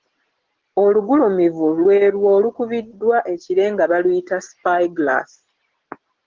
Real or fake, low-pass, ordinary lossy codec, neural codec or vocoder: real; 7.2 kHz; Opus, 16 kbps; none